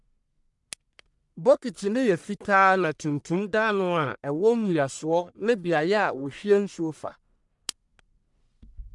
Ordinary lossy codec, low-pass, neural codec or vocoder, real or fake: MP3, 96 kbps; 10.8 kHz; codec, 44.1 kHz, 1.7 kbps, Pupu-Codec; fake